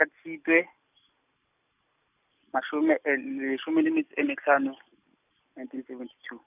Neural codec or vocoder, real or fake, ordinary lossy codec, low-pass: none; real; none; 3.6 kHz